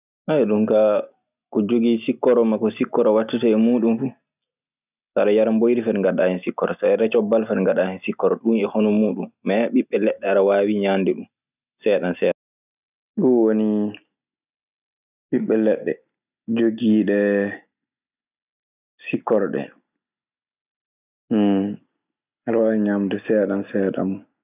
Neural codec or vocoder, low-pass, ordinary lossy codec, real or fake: none; 3.6 kHz; none; real